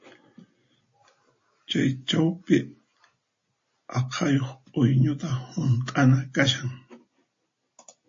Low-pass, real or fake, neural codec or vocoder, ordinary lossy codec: 7.2 kHz; real; none; MP3, 32 kbps